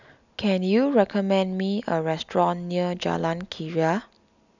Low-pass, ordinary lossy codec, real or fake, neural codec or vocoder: 7.2 kHz; none; real; none